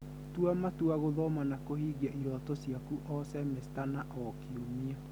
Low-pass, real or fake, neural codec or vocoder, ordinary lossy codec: none; real; none; none